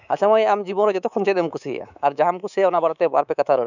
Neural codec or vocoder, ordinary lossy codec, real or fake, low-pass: codec, 24 kHz, 3.1 kbps, DualCodec; none; fake; 7.2 kHz